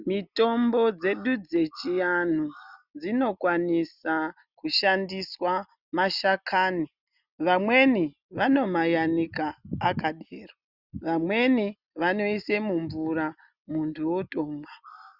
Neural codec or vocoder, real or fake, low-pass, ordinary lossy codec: none; real; 5.4 kHz; Opus, 64 kbps